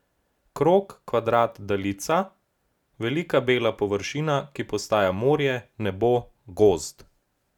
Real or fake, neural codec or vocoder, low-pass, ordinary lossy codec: real; none; 19.8 kHz; none